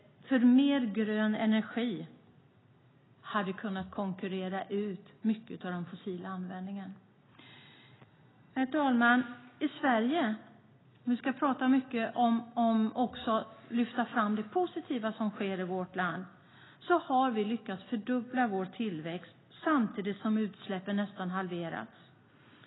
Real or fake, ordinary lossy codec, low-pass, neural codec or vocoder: real; AAC, 16 kbps; 7.2 kHz; none